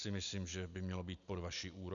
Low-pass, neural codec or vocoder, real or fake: 7.2 kHz; none; real